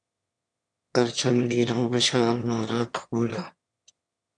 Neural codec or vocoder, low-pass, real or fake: autoencoder, 22.05 kHz, a latent of 192 numbers a frame, VITS, trained on one speaker; 9.9 kHz; fake